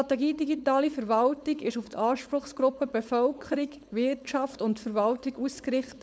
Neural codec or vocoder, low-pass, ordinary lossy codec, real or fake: codec, 16 kHz, 4.8 kbps, FACodec; none; none; fake